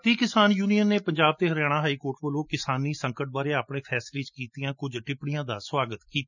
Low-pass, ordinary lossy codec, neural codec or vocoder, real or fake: 7.2 kHz; none; none; real